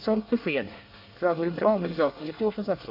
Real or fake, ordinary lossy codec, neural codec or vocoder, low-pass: fake; none; codec, 24 kHz, 1 kbps, SNAC; 5.4 kHz